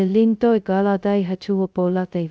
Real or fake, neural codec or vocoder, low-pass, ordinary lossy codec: fake; codec, 16 kHz, 0.2 kbps, FocalCodec; none; none